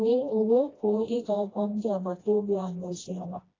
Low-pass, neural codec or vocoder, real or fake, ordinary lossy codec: 7.2 kHz; codec, 16 kHz, 1 kbps, FreqCodec, smaller model; fake; AAC, 32 kbps